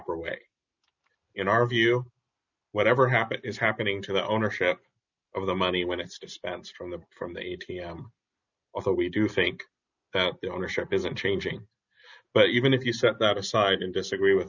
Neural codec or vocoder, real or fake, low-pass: none; real; 7.2 kHz